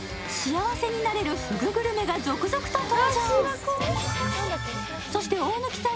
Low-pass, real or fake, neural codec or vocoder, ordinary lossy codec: none; real; none; none